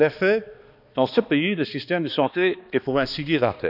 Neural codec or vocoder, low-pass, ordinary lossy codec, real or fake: codec, 16 kHz, 2 kbps, X-Codec, HuBERT features, trained on balanced general audio; 5.4 kHz; none; fake